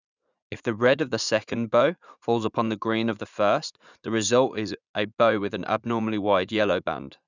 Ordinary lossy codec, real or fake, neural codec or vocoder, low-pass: none; fake; vocoder, 44.1 kHz, 80 mel bands, Vocos; 7.2 kHz